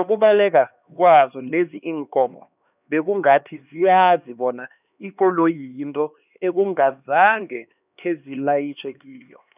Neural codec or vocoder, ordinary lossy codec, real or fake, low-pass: codec, 16 kHz, 2 kbps, X-Codec, HuBERT features, trained on LibriSpeech; none; fake; 3.6 kHz